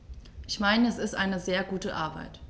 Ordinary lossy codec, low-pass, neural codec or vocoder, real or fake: none; none; none; real